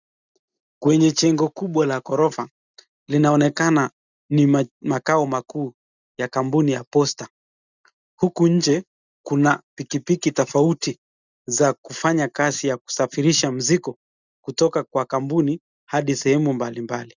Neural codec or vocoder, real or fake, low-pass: none; real; 7.2 kHz